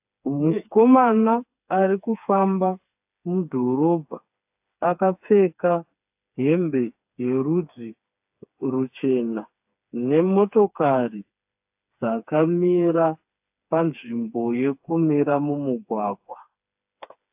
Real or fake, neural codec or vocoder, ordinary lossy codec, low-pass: fake; codec, 16 kHz, 4 kbps, FreqCodec, smaller model; AAC, 32 kbps; 3.6 kHz